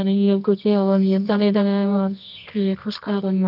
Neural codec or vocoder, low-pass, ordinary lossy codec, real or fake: codec, 24 kHz, 0.9 kbps, WavTokenizer, medium music audio release; 5.4 kHz; none; fake